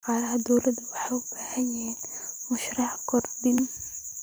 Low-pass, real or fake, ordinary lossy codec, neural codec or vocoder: none; fake; none; vocoder, 44.1 kHz, 128 mel bands every 512 samples, BigVGAN v2